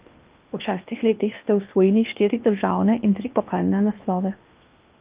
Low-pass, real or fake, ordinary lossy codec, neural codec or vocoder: 3.6 kHz; fake; Opus, 24 kbps; codec, 16 kHz in and 24 kHz out, 0.8 kbps, FocalCodec, streaming, 65536 codes